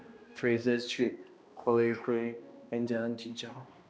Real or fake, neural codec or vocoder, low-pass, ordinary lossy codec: fake; codec, 16 kHz, 1 kbps, X-Codec, HuBERT features, trained on balanced general audio; none; none